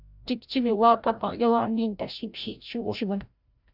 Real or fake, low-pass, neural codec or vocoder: fake; 5.4 kHz; codec, 16 kHz, 0.5 kbps, FreqCodec, larger model